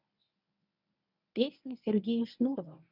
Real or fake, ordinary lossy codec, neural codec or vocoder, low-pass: fake; none; codec, 24 kHz, 0.9 kbps, WavTokenizer, medium speech release version 1; 5.4 kHz